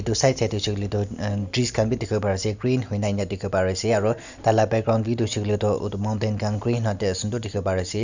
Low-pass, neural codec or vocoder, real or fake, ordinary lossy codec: 7.2 kHz; none; real; Opus, 64 kbps